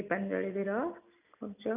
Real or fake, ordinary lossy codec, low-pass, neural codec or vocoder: real; none; 3.6 kHz; none